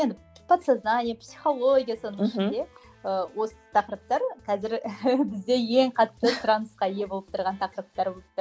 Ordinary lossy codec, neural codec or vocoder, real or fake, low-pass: none; none; real; none